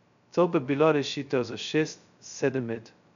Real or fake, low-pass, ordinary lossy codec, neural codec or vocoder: fake; 7.2 kHz; none; codec, 16 kHz, 0.2 kbps, FocalCodec